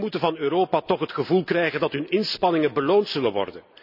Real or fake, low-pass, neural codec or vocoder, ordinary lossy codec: real; 5.4 kHz; none; none